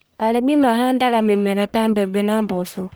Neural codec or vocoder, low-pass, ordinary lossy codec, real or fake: codec, 44.1 kHz, 1.7 kbps, Pupu-Codec; none; none; fake